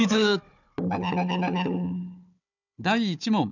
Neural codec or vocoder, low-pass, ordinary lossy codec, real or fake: codec, 16 kHz, 4 kbps, FunCodec, trained on Chinese and English, 50 frames a second; 7.2 kHz; none; fake